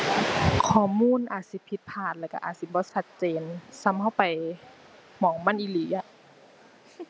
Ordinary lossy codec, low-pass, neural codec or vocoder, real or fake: none; none; none; real